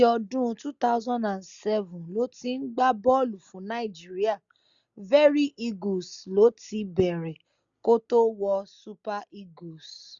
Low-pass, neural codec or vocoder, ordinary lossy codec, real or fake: 7.2 kHz; none; none; real